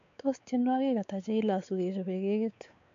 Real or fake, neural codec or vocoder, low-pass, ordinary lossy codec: fake; codec, 16 kHz, 4 kbps, X-Codec, WavLM features, trained on Multilingual LibriSpeech; 7.2 kHz; AAC, 64 kbps